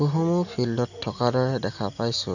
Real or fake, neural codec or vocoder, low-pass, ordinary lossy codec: real; none; 7.2 kHz; none